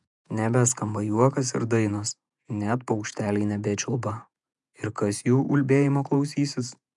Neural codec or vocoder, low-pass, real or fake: vocoder, 48 kHz, 128 mel bands, Vocos; 10.8 kHz; fake